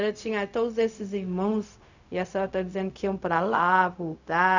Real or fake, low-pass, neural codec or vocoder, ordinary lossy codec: fake; 7.2 kHz; codec, 16 kHz, 0.4 kbps, LongCat-Audio-Codec; none